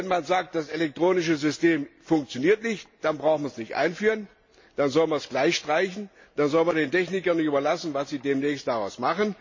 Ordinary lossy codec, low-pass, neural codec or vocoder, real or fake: MP3, 32 kbps; 7.2 kHz; none; real